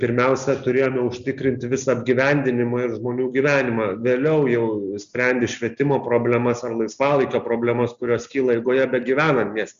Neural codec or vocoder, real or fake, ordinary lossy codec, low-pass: none; real; Opus, 64 kbps; 7.2 kHz